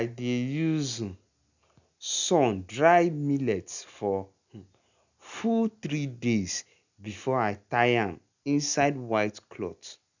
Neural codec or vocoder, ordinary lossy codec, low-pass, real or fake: none; none; 7.2 kHz; real